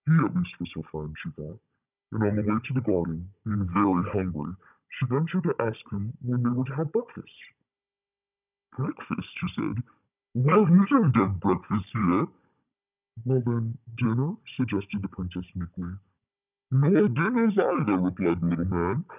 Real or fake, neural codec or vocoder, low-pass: fake; codec, 16 kHz, 16 kbps, FunCodec, trained on Chinese and English, 50 frames a second; 3.6 kHz